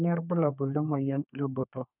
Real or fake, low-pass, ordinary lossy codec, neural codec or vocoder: fake; 3.6 kHz; none; codec, 32 kHz, 1.9 kbps, SNAC